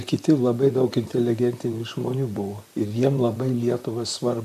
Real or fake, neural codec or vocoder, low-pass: fake; vocoder, 44.1 kHz, 128 mel bands, Pupu-Vocoder; 14.4 kHz